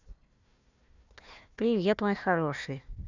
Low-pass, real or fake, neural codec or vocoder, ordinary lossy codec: 7.2 kHz; fake; codec, 16 kHz, 1 kbps, FunCodec, trained on Chinese and English, 50 frames a second; none